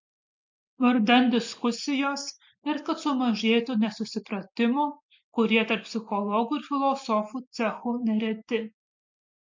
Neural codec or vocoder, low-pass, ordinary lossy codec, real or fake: none; 7.2 kHz; MP3, 48 kbps; real